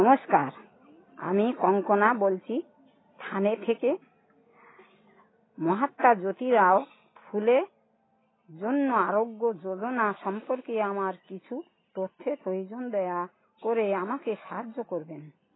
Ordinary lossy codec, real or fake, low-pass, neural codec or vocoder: AAC, 16 kbps; real; 7.2 kHz; none